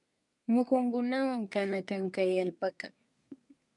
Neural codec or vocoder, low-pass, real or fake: codec, 24 kHz, 1 kbps, SNAC; 10.8 kHz; fake